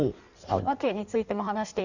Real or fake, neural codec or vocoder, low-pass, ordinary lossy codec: fake; codec, 16 kHz in and 24 kHz out, 1.1 kbps, FireRedTTS-2 codec; 7.2 kHz; none